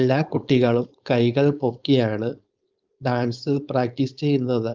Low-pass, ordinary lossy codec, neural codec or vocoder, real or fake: 7.2 kHz; Opus, 32 kbps; codec, 16 kHz, 4.8 kbps, FACodec; fake